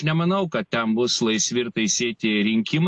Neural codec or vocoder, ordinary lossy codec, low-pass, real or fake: none; AAC, 64 kbps; 10.8 kHz; real